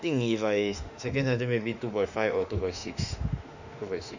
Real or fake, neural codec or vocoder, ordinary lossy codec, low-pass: fake; autoencoder, 48 kHz, 32 numbers a frame, DAC-VAE, trained on Japanese speech; none; 7.2 kHz